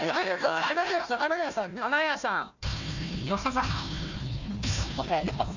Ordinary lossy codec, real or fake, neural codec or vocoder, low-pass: none; fake; codec, 16 kHz, 1 kbps, FunCodec, trained on Chinese and English, 50 frames a second; 7.2 kHz